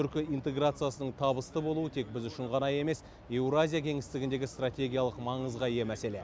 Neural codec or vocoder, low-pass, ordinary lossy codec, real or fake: none; none; none; real